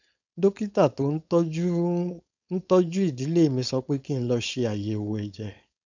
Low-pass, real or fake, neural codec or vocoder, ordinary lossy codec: 7.2 kHz; fake; codec, 16 kHz, 4.8 kbps, FACodec; none